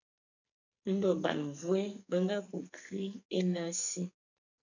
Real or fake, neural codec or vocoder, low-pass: fake; codec, 44.1 kHz, 2.6 kbps, SNAC; 7.2 kHz